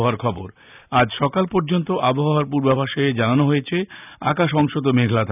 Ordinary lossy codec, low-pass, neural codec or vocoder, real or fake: none; 3.6 kHz; none; real